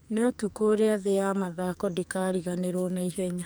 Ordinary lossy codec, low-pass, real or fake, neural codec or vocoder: none; none; fake; codec, 44.1 kHz, 2.6 kbps, SNAC